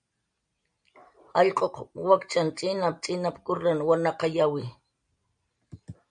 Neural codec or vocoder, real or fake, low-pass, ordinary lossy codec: none; real; 9.9 kHz; MP3, 96 kbps